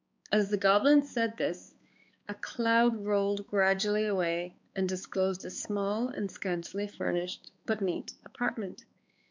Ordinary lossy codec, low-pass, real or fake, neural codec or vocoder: MP3, 64 kbps; 7.2 kHz; fake; codec, 16 kHz, 4 kbps, X-Codec, HuBERT features, trained on balanced general audio